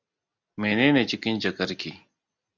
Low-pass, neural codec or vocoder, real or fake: 7.2 kHz; none; real